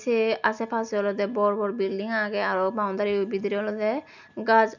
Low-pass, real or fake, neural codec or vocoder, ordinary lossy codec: 7.2 kHz; real; none; none